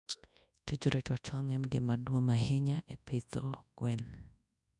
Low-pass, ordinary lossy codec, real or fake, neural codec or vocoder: 10.8 kHz; none; fake; codec, 24 kHz, 0.9 kbps, WavTokenizer, large speech release